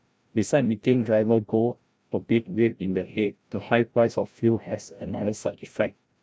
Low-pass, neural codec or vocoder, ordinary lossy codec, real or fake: none; codec, 16 kHz, 0.5 kbps, FreqCodec, larger model; none; fake